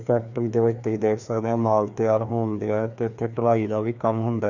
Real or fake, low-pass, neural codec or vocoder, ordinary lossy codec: fake; 7.2 kHz; codec, 16 kHz, 2 kbps, FreqCodec, larger model; none